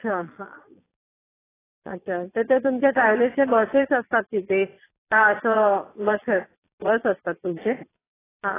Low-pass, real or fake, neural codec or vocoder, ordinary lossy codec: 3.6 kHz; fake; vocoder, 22.05 kHz, 80 mel bands, Vocos; AAC, 16 kbps